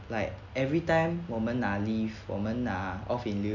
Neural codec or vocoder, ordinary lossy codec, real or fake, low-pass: none; none; real; 7.2 kHz